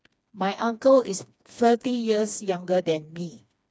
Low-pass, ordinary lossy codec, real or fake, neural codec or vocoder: none; none; fake; codec, 16 kHz, 2 kbps, FreqCodec, smaller model